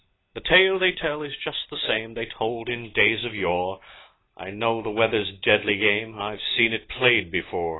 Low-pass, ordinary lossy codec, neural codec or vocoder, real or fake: 7.2 kHz; AAC, 16 kbps; none; real